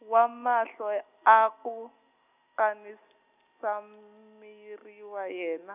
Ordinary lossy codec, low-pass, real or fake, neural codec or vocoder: none; 3.6 kHz; real; none